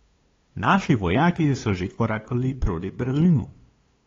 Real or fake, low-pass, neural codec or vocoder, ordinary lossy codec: fake; 7.2 kHz; codec, 16 kHz, 2 kbps, FunCodec, trained on LibriTTS, 25 frames a second; AAC, 32 kbps